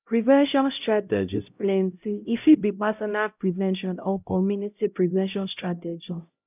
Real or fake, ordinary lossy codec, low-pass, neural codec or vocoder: fake; none; 3.6 kHz; codec, 16 kHz, 0.5 kbps, X-Codec, HuBERT features, trained on LibriSpeech